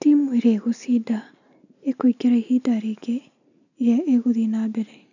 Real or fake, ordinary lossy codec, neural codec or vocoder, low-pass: real; none; none; 7.2 kHz